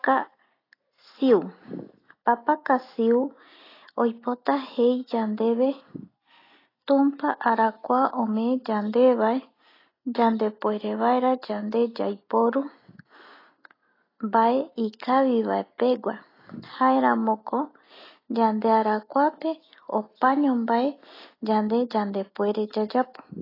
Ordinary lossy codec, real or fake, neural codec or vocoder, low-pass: AAC, 24 kbps; real; none; 5.4 kHz